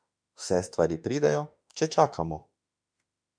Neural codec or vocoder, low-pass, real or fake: autoencoder, 48 kHz, 32 numbers a frame, DAC-VAE, trained on Japanese speech; 9.9 kHz; fake